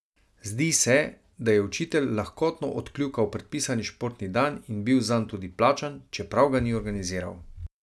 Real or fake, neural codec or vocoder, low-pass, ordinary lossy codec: real; none; none; none